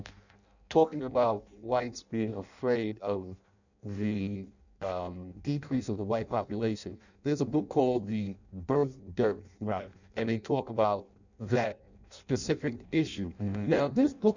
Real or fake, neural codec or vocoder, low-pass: fake; codec, 16 kHz in and 24 kHz out, 0.6 kbps, FireRedTTS-2 codec; 7.2 kHz